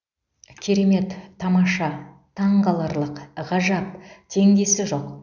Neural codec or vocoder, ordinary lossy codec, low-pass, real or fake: none; none; 7.2 kHz; real